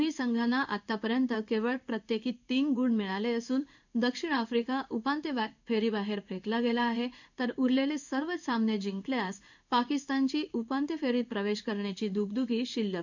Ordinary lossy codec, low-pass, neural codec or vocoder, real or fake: none; 7.2 kHz; codec, 16 kHz in and 24 kHz out, 1 kbps, XY-Tokenizer; fake